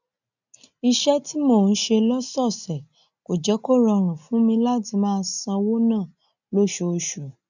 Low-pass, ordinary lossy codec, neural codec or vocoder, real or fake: 7.2 kHz; none; none; real